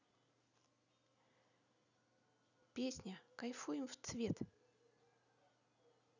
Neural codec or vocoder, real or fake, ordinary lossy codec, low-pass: none; real; none; 7.2 kHz